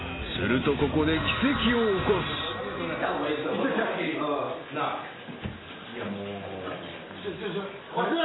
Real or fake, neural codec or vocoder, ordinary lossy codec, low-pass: real; none; AAC, 16 kbps; 7.2 kHz